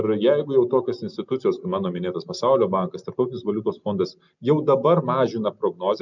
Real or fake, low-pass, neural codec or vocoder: real; 7.2 kHz; none